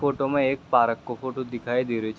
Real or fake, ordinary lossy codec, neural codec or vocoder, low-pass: real; none; none; none